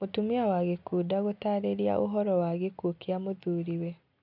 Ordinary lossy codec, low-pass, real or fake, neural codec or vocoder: none; 5.4 kHz; real; none